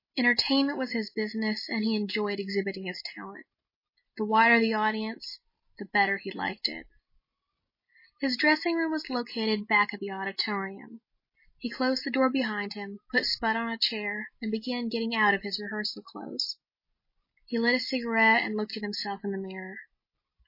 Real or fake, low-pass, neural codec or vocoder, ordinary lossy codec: real; 5.4 kHz; none; MP3, 24 kbps